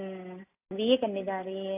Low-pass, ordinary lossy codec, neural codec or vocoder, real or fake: 3.6 kHz; AAC, 32 kbps; none; real